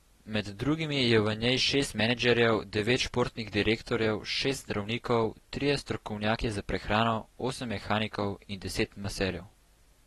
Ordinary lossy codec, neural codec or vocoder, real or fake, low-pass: AAC, 32 kbps; none; real; 14.4 kHz